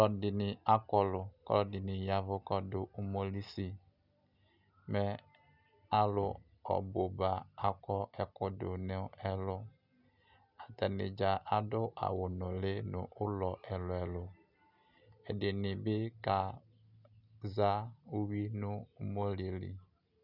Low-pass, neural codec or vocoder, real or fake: 5.4 kHz; none; real